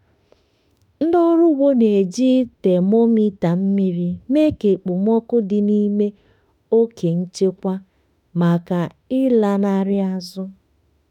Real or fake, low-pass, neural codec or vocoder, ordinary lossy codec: fake; 19.8 kHz; autoencoder, 48 kHz, 32 numbers a frame, DAC-VAE, trained on Japanese speech; none